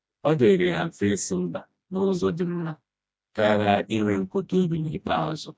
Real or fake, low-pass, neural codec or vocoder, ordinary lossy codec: fake; none; codec, 16 kHz, 1 kbps, FreqCodec, smaller model; none